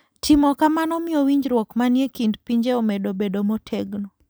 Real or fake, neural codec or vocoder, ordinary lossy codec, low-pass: real; none; none; none